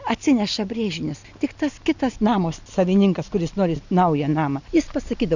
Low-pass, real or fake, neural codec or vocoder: 7.2 kHz; real; none